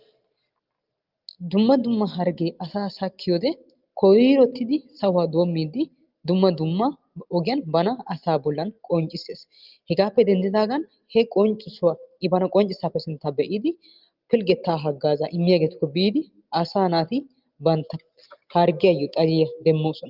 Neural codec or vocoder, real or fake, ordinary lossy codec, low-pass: none; real; Opus, 32 kbps; 5.4 kHz